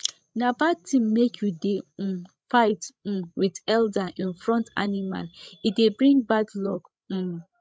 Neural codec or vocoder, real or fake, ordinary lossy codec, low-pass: codec, 16 kHz, 16 kbps, FreqCodec, larger model; fake; none; none